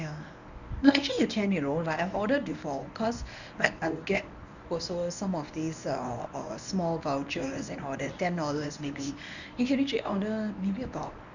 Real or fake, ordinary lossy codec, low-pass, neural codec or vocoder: fake; none; 7.2 kHz; codec, 24 kHz, 0.9 kbps, WavTokenizer, medium speech release version 1